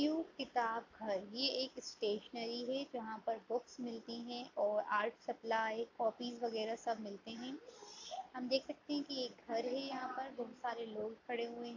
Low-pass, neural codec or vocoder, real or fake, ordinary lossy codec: 7.2 kHz; none; real; AAC, 48 kbps